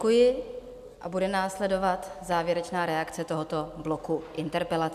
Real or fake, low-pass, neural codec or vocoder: real; 14.4 kHz; none